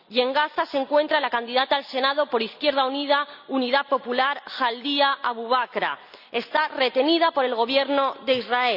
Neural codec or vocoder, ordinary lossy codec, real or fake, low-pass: none; none; real; 5.4 kHz